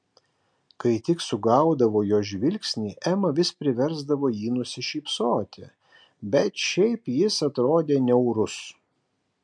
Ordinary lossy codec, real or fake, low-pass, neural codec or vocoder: MP3, 64 kbps; real; 9.9 kHz; none